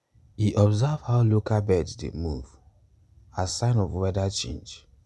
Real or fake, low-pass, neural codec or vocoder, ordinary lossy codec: real; none; none; none